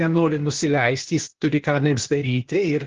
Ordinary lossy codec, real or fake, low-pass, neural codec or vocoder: Opus, 16 kbps; fake; 7.2 kHz; codec, 16 kHz, 0.8 kbps, ZipCodec